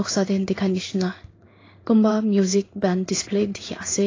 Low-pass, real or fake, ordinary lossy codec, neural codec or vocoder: 7.2 kHz; fake; AAC, 32 kbps; codec, 16 kHz in and 24 kHz out, 1 kbps, XY-Tokenizer